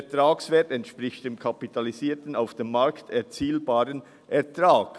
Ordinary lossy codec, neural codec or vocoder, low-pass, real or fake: none; none; none; real